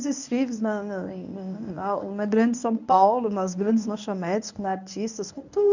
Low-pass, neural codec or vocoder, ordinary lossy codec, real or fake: 7.2 kHz; codec, 24 kHz, 0.9 kbps, WavTokenizer, medium speech release version 1; none; fake